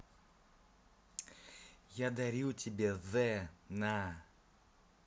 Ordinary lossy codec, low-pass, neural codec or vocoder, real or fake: none; none; none; real